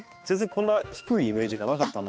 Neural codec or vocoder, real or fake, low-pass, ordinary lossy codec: codec, 16 kHz, 2 kbps, X-Codec, HuBERT features, trained on balanced general audio; fake; none; none